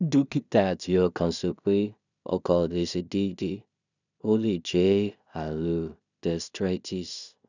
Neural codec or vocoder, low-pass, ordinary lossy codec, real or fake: codec, 16 kHz in and 24 kHz out, 0.4 kbps, LongCat-Audio-Codec, two codebook decoder; 7.2 kHz; none; fake